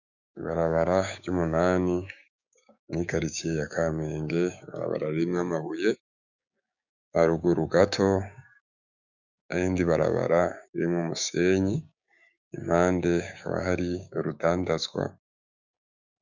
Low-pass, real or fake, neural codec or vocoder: 7.2 kHz; fake; codec, 16 kHz, 6 kbps, DAC